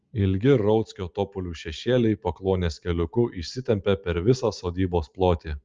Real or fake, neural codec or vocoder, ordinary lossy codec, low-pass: real; none; Opus, 24 kbps; 7.2 kHz